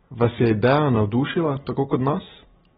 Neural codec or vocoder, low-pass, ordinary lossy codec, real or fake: none; 7.2 kHz; AAC, 16 kbps; real